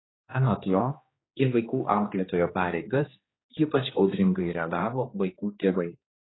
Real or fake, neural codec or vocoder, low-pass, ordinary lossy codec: fake; codec, 16 kHz, 2 kbps, X-Codec, HuBERT features, trained on general audio; 7.2 kHz; AAC, 16 kbps